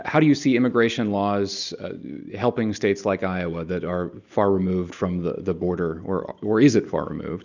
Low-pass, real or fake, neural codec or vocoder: 7.2 kHz; real; none